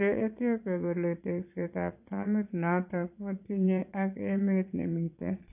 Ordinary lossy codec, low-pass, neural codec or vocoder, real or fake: AAC, 32 kbps; 3.6 kHz; vocoder, 22.05 kHz, 80 mel bands, Vocos; fake